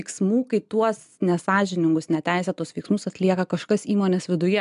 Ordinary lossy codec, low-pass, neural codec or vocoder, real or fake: MP3, 96 kbps; 10.8 kHz; none; real